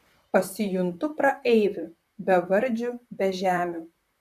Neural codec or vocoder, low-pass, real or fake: vocoder, 44.1 kHz, 128 mel bands, Pupu-Vocoder; 14.4 kHz; fake